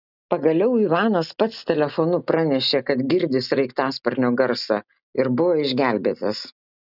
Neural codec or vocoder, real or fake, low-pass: none; real; 5.4 kHz